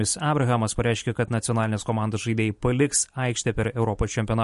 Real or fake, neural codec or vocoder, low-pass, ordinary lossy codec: fake; vocoder, 44.1 kHz, 128 mel bands every 256 samples, BigVGAN v2; 14.4 kHz; MP3, 48 kbps